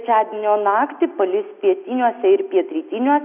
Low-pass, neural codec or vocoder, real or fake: 3.6 kHz; none; real